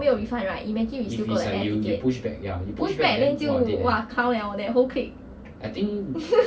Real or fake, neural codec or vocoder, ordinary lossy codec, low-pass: real; none; none; none